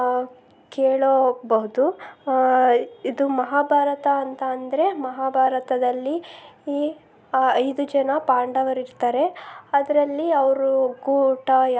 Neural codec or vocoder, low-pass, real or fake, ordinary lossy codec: none; none; real; none